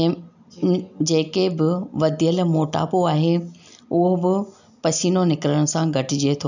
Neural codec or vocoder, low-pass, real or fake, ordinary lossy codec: none; 7.2 kHz; real; none